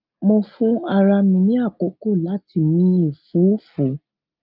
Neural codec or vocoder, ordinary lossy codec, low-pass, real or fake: none; Opus, 24 kbps; 5.4 kHz; real